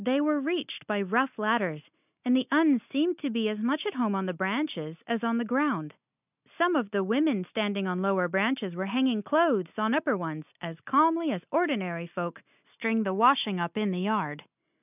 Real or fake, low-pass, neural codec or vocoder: real; 3.6 kHz; none